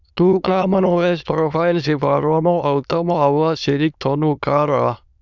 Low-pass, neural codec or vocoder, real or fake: 7.2 kHz; autoencoder, 22.05 kHz, a latent of 192 numbers a frame, VITS, trained on many speakers; fake